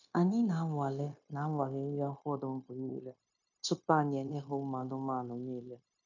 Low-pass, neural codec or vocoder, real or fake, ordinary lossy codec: 7.2 kHz; codec, 16 kHz, 0.9 kbps, LongCat-Audio-Codec; fake; none